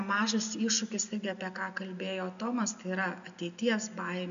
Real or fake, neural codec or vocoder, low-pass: real; none; 7.2 kHz